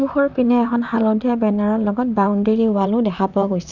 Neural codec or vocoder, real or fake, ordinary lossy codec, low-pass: vocoder, 44.1 kHz, 128 mel bands, Pupu-Vocoder; fake; none; 7.2 kHz